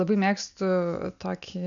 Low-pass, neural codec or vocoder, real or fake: 7.2 kHz; none; real